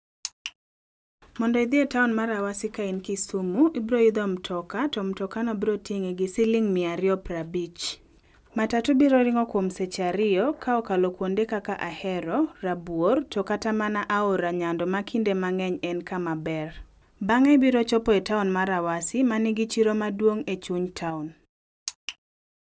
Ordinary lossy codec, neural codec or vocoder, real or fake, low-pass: none; none; real; none